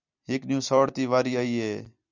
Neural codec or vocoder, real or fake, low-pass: vocoder, 44.1 kHz, 128 mel bands every 256 samples, BigVGAN v2; fake; 7.2 kHz